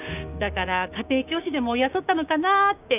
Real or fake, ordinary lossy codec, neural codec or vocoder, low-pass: fake; none; codec, 16 kHz, 6 kbps, DAC; 3.6 kHz